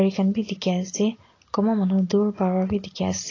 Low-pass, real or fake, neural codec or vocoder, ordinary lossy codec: 7.2 kHz; real; none; AAC, 32 kbps